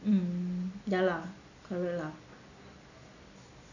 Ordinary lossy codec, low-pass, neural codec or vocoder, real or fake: none; 7.2 kHz; none; real